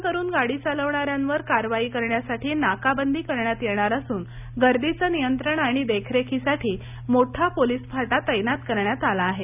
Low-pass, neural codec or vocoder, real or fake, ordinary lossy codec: 3.6 kHz; none; real; none